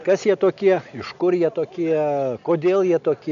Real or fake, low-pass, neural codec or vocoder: real; 7.2 kHz; none